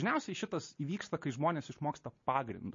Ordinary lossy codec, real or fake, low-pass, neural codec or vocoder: MP3, 32 kbps; real; 7.2 kHz; none